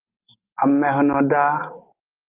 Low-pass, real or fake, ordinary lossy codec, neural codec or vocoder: 3.6 kHz; real; Opus, 24 kbps; none